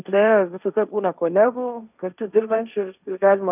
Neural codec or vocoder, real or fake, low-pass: codec, 16 kHz, 1.1 kbps, Voila-Tokenizer; fake; 3.6 kHz